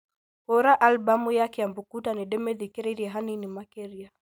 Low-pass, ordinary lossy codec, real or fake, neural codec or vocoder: none; none; real; none